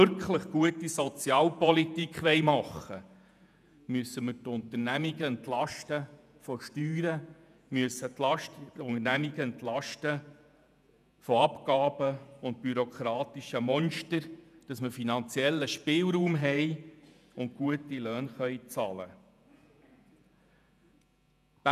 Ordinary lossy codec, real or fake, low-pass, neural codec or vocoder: none; fake; 14.4 kHz; vocoder, 48 kHz, 128 mel bands, Vocos